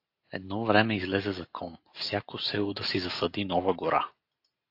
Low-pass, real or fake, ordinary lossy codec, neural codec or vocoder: 5.4 kHz; real; AAC, 32 kbps; none